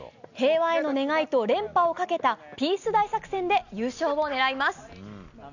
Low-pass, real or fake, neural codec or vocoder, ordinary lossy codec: 7.2 kHz; real; none; none